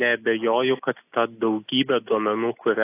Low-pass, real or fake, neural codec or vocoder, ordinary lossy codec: 3.6 kHz; real; none; AAC, 24 kbps